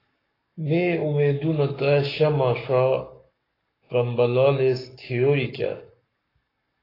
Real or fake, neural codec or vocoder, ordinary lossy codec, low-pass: fake; codec, 44.1 kHz, 7.8 kbps, Pupu-Codec; AAC, 24 kbps; 5.4 kHz